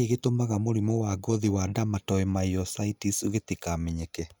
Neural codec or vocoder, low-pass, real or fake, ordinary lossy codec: none; none; real; none